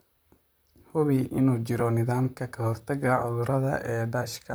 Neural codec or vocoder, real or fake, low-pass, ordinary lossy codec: vocoder, 44.1 kHz, 128 mel bands, Pupu-Vocoder; fake; none; none